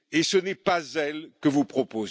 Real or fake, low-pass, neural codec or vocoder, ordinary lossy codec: real; none; none; none